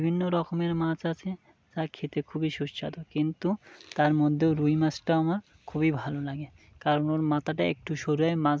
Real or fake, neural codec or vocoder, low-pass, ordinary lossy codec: real; none; none; none